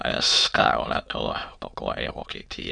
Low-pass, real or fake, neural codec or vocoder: 9.9 kHz; fake; autoencoder, 22.05 kHz, a latent of 192 numbers a frame, VITS, trained on many speakers